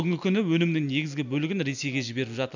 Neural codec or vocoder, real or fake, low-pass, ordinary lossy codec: none; real; 7.2 kHz; none